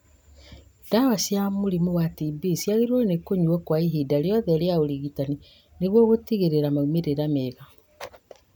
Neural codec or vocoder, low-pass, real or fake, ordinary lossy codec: none; 19.8 kHz; real; none